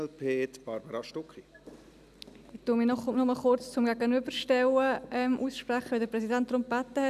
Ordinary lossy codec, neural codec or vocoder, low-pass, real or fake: none; none; 14.4 kHz; real